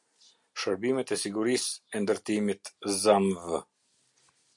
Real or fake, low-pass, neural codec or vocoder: real; 10.8 kHz; none